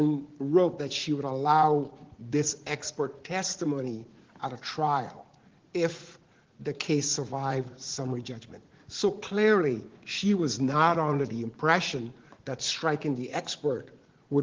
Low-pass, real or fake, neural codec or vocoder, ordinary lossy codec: 7.2 kHz; fake; vocoder, 44.1 kHz, 80 mel bands, Vocos; Opus, 16 kbps